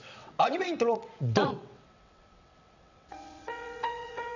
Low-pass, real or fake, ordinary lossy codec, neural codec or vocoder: 7.2 kHz; fake; none; vocoder, 22.05 kHz, 80 mel bands, WaveNeXt